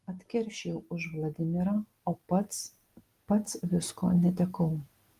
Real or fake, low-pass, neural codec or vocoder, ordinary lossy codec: real; 14.4 kHz; none; Opus, 24 kbps